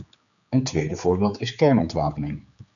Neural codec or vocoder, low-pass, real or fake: codec, 16 kHz, 4 kbps, X-Codec, HuBERT features, trained on general audio; 7.2 kHz; fake